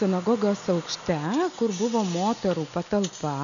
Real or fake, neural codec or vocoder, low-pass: real; none; 7.2 kHz